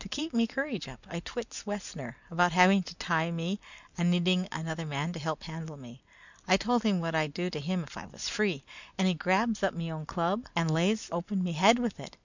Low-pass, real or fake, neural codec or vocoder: 7.2 kHz; real; none